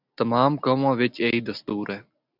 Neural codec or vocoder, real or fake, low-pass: none; real; 5.4 kHz